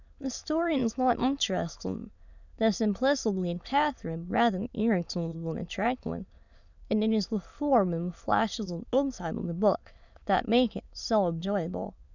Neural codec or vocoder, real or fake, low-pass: autoencoder, 22.05 kHz, a latent of 192 numbers a frame, VITS, trained on many speakers; fake; 7.2 kHz